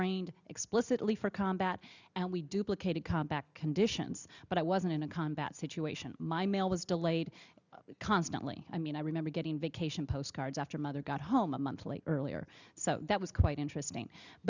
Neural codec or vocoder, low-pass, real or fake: none; 7.2 kHz; real